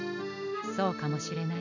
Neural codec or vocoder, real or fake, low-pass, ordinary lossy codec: none; real; 7.2 kHz; none